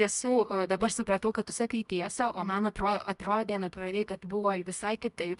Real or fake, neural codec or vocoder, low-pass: fake; codec, 24 kHz, 0.9 kbps, WavTokenizer, medium music audio release; 10.8 kHz